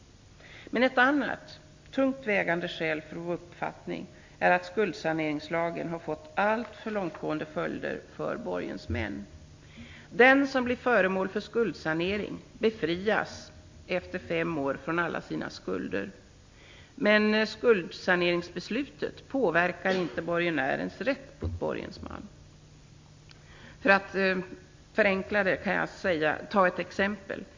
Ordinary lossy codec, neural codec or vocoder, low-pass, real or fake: MP3, 48 kbps; none; 7.2 kHz; real